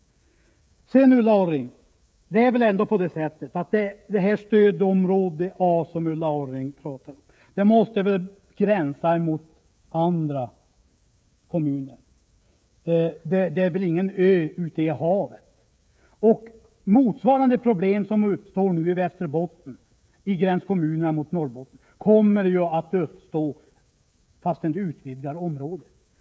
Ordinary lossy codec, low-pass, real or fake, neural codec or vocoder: none; none; fake; codec, 16 kHz, 16 kbps, FreqCodec, smaller model